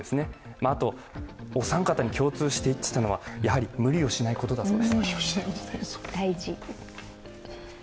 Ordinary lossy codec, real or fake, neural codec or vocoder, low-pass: none; real; none; none